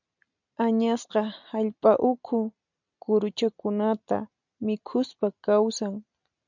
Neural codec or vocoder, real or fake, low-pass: none; real; 7.2 kHz